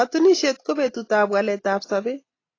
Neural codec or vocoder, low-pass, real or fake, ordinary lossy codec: none; 7.2 kHz; real; AAC, 32 kbps